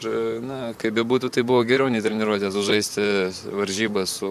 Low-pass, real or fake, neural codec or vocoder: 14.4 kHz; fake; vocoder, 44.1 kHz, 128 mel bands, Pupu-Vocoder